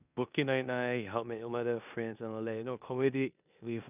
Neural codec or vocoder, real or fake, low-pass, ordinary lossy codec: codec, 16 kHz in and 24 kHz out, 0.9 kbps, LongCat-Audio-Codec, four codebook decoder; fake; 3.6 kHz; none